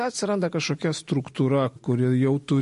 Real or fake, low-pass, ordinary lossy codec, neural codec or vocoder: real; 10.8 kHz; MP3, 48 kbps; none